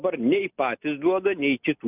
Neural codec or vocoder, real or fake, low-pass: none; real; 3.6 kHz